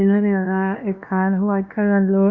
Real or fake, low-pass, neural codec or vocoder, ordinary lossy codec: fake; 7.2 kHz; codec, 16 kHz, 1 kbps, X-Codec, WavLM features, trained on Multilingual LibriSpeech; Opus, 64 kbps